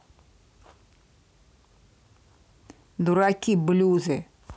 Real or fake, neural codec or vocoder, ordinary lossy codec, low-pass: real; none; none; none